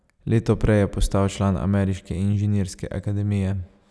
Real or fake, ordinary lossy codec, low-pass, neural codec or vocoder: real; none; none; none